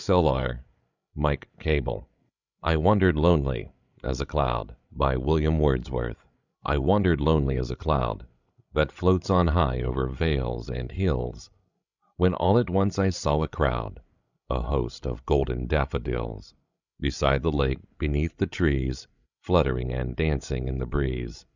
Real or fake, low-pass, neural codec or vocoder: fake; 7.2 kHz; codec, 16 kHz, 16 kbps, FreqCodec, larger model